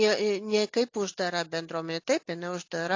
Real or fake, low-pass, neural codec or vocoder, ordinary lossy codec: real; 7.2 kHz; none; AAC, 48 kbps